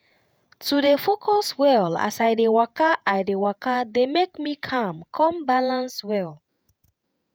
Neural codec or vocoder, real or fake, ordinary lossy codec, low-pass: vocoder, 48 kHz, 128 mel bands, Vocos; fake; none; none